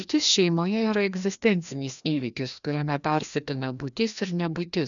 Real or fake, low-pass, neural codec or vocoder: fake; 7.2 kHz; codec, 16 kHz, 1 kbps, FreqCodec, larger model